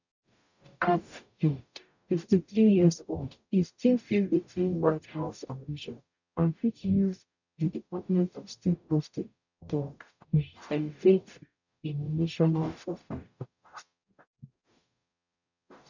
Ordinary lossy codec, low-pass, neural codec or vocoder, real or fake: none; 7.2 kHz; codec, 44.1 kHz, 0.9 kbps, DAC; fake